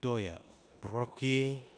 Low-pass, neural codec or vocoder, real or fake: 9.9 kHz; codec, 16 kHz in and 24 kHz out, 0.9 kbps, LongCat-Audio-Codec, four codebook decoder; fake